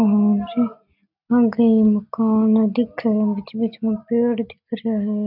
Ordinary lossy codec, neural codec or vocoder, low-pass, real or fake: none; none; 5.4 kHz; real